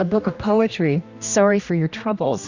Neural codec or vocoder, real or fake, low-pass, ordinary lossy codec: codec, 16 kHz, 1 kbps, X-Codec, HuBERT features, trained on general audio; fake; 7.2 kHz; Opus, 64 kbps